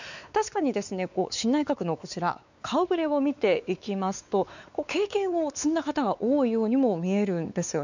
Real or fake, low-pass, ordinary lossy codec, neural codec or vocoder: fake; 7.2 kHz; none; codec, 16 kHz, 4 kbps, X-Codec, WavLM features, trained on Multilingual LibriSpeech